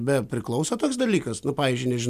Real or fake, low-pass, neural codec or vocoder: real; 14.4 kHz; none